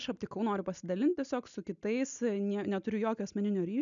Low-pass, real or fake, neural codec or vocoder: 7.2 kHz; real; none